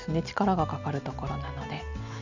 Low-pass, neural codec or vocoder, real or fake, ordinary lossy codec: 7.2 kHz; none; real; none